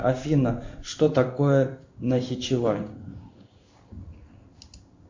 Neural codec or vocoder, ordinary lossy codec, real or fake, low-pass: codec, 16 kHz in and 24 kHz out, 1 kbps, XY-Tokenizer; MP3, 64 kbps; fake; 7.2 kHz